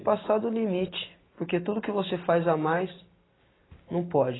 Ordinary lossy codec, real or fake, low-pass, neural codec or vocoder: AAC, 16 kbps; real; 7.2 kHz; none